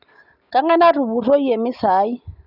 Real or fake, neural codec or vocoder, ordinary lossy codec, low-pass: real; none; none; 5.4 kHz